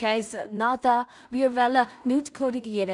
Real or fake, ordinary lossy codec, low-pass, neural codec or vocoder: fake; none; 10.8 kHz; codec, 16 kHz in and 24 kHz out, 0.4 kbps, LongCat-Audio-Codec, two codebook decoder